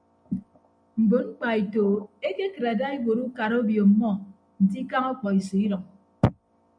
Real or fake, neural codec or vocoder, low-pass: real; none; 9.9 kHz